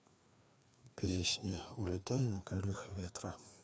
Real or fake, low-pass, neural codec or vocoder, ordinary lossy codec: fake; none; codec, 16 kHz, 2 kbps, FreqCodec, larger model; none